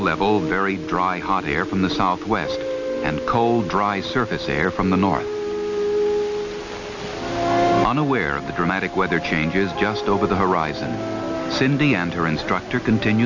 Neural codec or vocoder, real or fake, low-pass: none; real; 7.2 kHz